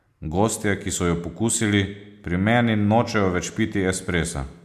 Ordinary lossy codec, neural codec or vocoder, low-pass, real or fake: MP3, 96 kbps; none; 14.4 kHz; real